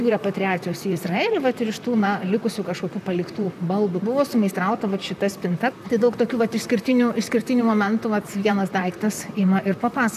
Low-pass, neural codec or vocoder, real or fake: 14.4 kHz; vocoder, 44.1 kHz, 128 mel bands, Pupu-Vocoder; fake